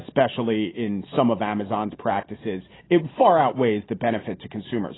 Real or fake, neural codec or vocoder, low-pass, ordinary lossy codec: real; none; 7.2 kHz; AAC, 16 kbps